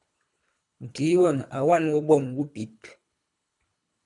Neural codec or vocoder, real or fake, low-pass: codec, 24 kHz, 3 kbps, HILCodec; fake; 10.8 kHz